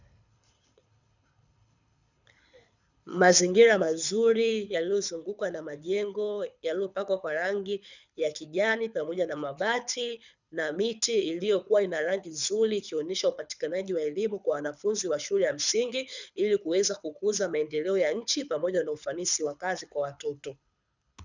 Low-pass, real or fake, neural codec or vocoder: 7.2 kHz; fake; codec, 24 kHz, 6 kbps, HILCodec